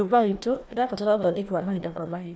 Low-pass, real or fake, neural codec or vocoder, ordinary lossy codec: none; fake; codec, 16 kHz, 1 kbps, FunCodec, trained on Chinese and English, 50 frames a second; none